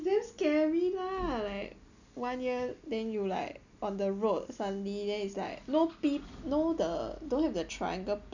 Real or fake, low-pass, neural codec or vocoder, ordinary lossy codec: real; 7.2 kHz; none; none